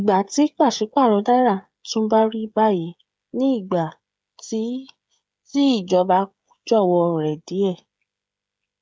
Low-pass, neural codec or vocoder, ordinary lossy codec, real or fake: none; codec, 16 kHz, 16 kbps, FreqCodec, smaller model; none; fake